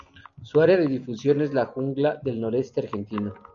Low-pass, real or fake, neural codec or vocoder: 7.2 kHz; real; none